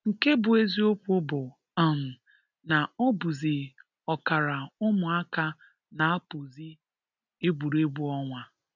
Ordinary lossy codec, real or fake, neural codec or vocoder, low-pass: none; real; none; 7.2 kHz